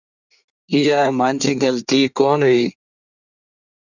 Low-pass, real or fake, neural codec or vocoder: 7.2 kHz; fake; codec, 24 kHz, 1 kbps, SNAC